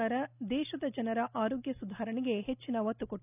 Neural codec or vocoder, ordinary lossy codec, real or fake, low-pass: none; none; real; 3.6 kHz